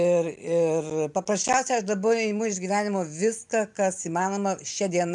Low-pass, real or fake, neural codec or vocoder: 10.8 kHz; fake; vocoder, 44.1 kHz, 128 mel bands every 256 samples, BigVGAN v2